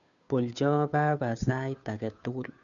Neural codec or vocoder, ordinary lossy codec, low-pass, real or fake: codec, 16 kHz, 2 kbps, FunCodec, trained on Chinese and English, 25 frames a second; none; 7.2 kHz; fake